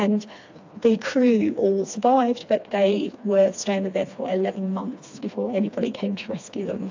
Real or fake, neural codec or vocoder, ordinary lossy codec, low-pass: fake; codec, 16 kHz, 2 kbps, FreqCodec, smaller model; AAC, 48 kbps; 7.2 kHz